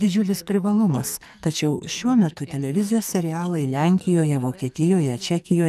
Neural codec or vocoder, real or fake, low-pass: codec, 44.1 kHz, 2.6 kbps, SNAC; fake; 14.4 kHz